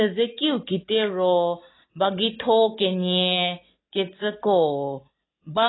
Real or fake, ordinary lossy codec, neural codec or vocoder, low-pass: real; AAC, 16 kbps; none; 7.2 kHz